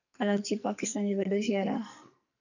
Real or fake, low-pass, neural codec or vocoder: fake; 7.2 kHz; codec, 44.1 kHz, 2.6 kbps, SNAC